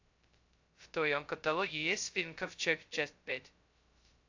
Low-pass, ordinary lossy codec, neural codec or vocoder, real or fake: 7.2 kHz; AAC, 48 kbps; codec, 16 kHz, 0.2 kbps, FocalCodec; fake